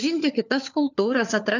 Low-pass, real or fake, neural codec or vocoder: 7.2 kHz; fake; codec, 16 kHz, 4 kbps, FunCodec, trained on LibriTTS, 50 frames a second